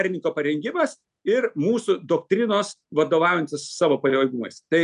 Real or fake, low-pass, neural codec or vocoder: real; 10.8 kHz; none